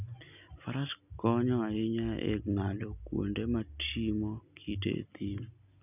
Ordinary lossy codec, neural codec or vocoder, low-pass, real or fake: none; none; 3.6 kHz; real